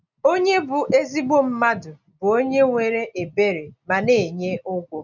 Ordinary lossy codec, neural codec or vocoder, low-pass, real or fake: none; none; 7.2 kHz; real